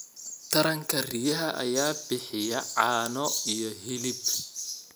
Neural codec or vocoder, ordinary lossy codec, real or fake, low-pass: none; none; real; none